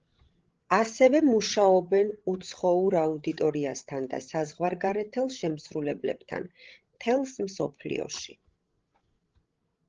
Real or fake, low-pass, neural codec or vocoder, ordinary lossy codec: fake; 7.2 kHz; codec, 16 kHz, 16 kbps, FreqCodec, larger model; Opus, 16 kbps